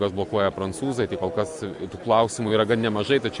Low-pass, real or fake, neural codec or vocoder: 10.8 kHz; real; none